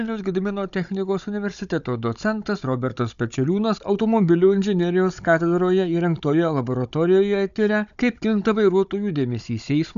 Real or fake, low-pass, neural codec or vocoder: fake; 7.2 kHz; codec, 16 kHz, 16 kbps, FunCodec, trained on Chinese and English, 50 frames a second